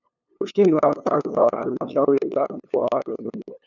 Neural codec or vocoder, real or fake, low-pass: codec, 16 kHz, 2 kbps, FunCodec, trained on LibriTTS, 25 frames a second; fake; 7.2 kHz